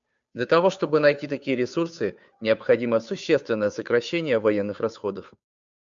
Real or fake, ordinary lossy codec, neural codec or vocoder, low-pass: fake; MP3, 64 kbps; codec, 16 kHz, 2 kbps, FunCodec, trained on Chinese and English, 25 frames a second; 7.2 kHz